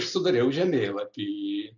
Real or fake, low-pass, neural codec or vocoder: real; 7.2 kHz; none